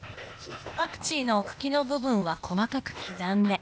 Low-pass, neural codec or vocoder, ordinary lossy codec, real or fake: none; codec, 16 kHz, 0.8 kbps, ZipCodec; none; fake